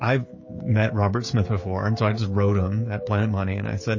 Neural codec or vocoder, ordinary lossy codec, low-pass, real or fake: codec, 16 kHz, 16 kbps, FreqCodec, smaller model; MP3, 32 kbps; 7.2 kHz; fake